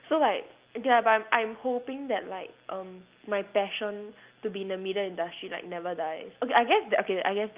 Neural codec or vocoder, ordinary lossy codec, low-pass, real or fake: none; Opus, 32 kbps; 3.6 kHz; real